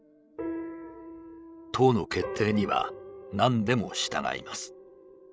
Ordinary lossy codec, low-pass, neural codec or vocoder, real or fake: none; none; codec, 16 kHz, 16 kbps, FreqCodec, larger model; fake